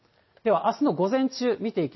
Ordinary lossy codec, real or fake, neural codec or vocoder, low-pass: MP3, 24 kbps; real; none; 7.2 kHz